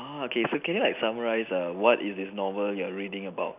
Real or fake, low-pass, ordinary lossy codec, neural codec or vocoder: real; 3.6 kHz; none; none